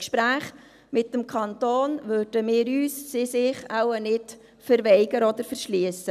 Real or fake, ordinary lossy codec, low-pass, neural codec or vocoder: real; none; 14.4 kHz; none